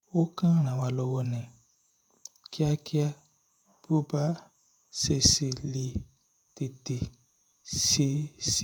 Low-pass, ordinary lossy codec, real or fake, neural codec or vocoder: none; none; fake; vocoder, 48 kHz, 128 mel bands, Vocos